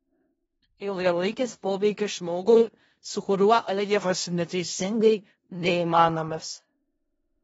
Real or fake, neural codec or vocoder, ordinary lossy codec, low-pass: fake; codec, 16 kHz in and 24 kHz out, 0.4 kbps, LongCat-Audio-Codec, four codebook decoder; AAC, 24 kbps; 10.8 kHz